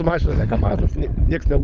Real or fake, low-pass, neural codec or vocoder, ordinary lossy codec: fake; 7.2 kHz; codec, 16 kHz, 16 kbps, FunCodec, trained on LibriTTS, 50 frames a second; Opus, 32 kbps